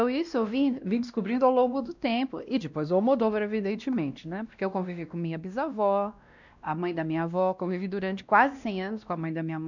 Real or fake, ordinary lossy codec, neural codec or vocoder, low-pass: fake; none; codec, 16 kHz, 1 kbps, X-Codec, WavLM features, trained on Multilingual LibriSpeech; 7.2 kHz